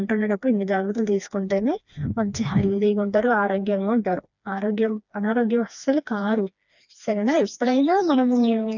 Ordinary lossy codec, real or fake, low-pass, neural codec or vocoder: none; fake; 7.2 kHz; codec, 16 kHz, 2 kbps, FreqCodec, smaller model